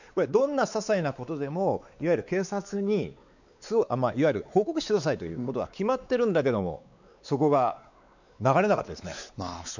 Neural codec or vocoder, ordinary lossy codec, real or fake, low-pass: codec, 16 kHz, 4 kbps, X-Codec, WavLM features, trained on Multilingual LibriSpeech; none; fake; 7.2 kHz